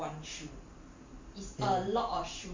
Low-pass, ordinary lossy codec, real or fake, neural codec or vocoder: 7.2 kHz; MP3, 48 kbps; fake; vocoder, 44.1 kHz, 128 mel bands every 512 samples, BigVGAN v2